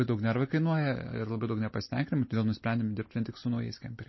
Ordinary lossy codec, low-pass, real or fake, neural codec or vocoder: MP3, 24 kbps; 7.2 kHz; real; none